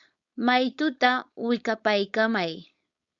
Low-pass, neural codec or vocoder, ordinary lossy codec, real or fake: 7.2 kHz; codec, 16 kHz, 4.8 kbps, FACodec; Opus, 64 kbps; fake